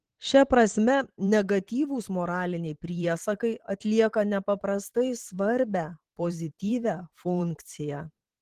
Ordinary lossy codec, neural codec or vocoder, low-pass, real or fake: Opus, 16 kbps; vocoder, 44.1 kHz, 128 mel bands every 512 samples, BigVGAN v2; 14.4 kHz; fake